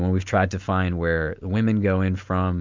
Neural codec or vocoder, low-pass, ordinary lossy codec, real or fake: none; 7.2 kHz; MP3, 64 kbps; real